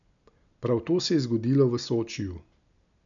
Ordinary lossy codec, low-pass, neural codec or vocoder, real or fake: none; 7.2 kHz; none; real